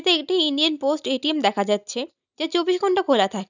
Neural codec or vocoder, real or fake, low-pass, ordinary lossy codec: none; real; 7.2 kHz; none